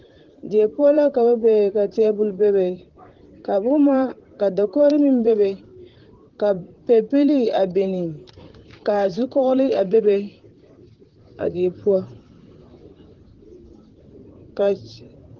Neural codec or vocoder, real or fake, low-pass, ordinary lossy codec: vocoder, 44.1 kHz, 128 mel bands, Pupu-Vocoder; fake; 7.2 kHz; Opus, 16 kbps